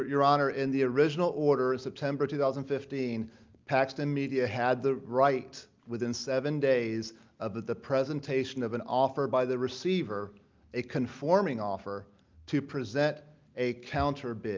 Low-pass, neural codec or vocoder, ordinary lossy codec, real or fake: 7.2 kHz; none; Opus, 24 kbps; real